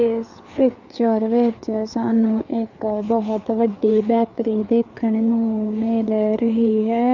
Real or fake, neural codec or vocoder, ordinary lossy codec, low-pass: fake; codec, 16 kHz, 4 kbps, FreqCodec, larger model; none; 7.2 kHz